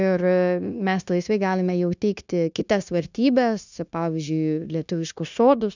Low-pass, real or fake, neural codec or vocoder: 7.2 kHz; fake; codec, 16 kHz, 0.9 kbps, LongCat-Audio-Codec